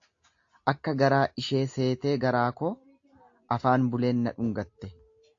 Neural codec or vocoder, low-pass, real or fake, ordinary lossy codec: none; 7.2 kHz; real; AAC, 48 kbps